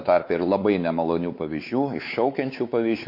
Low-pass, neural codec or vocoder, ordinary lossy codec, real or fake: 5.4 kHz; codec, 16 kHz, 4 kbps, X-Codec, WavLM features, trained on Multilingual LibriSpeech; MP3, 32 kbps; fake